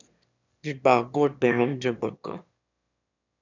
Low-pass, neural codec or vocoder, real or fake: 7.2 kHz; autoencoder, 22.05 kHz, a latent of 192 numbers a frame, VITS, trained on one speaker; fake